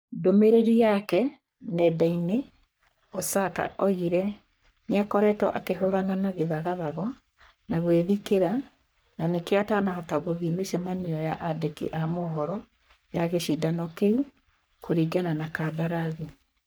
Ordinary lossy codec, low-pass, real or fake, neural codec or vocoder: none; none; fake; codec, 44.1 kHz, 3.4 kbps, Pupu-Codec